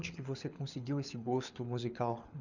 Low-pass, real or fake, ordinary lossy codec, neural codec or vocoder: 7.2 kHz; fake; none; codec, 24 kHz, 6 kbps, HILCodec